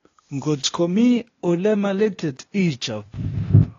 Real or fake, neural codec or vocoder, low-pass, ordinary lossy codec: fake; codec, 16 kHz, 0.8 kbps, ZipCodec; 7.2 kHz; AAC, 32 kbps